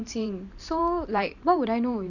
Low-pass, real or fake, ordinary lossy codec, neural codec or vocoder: 7.2 kHz; fake; none; vocoder, 44.1 kHz, 128 mel bands every 512 samples, BigVGAN v2